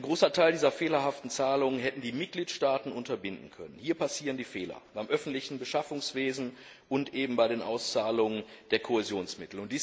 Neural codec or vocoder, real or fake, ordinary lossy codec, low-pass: none; real; none; none